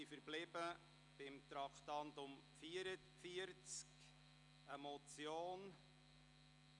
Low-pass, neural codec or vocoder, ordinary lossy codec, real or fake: 10.8 kHz; none; none; real